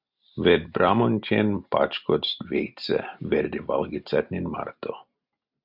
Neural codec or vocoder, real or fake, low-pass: none; real; 5.4 kHz